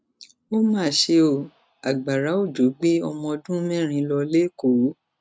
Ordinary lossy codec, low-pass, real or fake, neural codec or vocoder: none; none; real; none